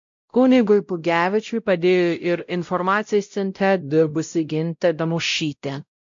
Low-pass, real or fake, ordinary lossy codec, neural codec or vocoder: 7.2 kHz; fake; MP3, 48 kbps; codec, 16 kHz, 0.5 kbps, X-Codec, WavLM features, trained on Multilingual LibriSpeech